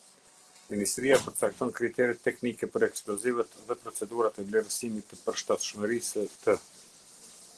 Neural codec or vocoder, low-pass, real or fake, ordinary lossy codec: none; 10.8 kHz; real; Opus, 16 kbps